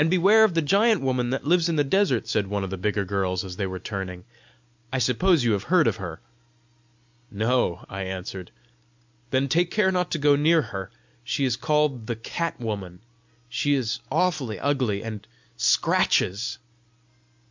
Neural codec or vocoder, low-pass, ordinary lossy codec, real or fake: none; 7.2 kHz; MP3, 64 kbps; real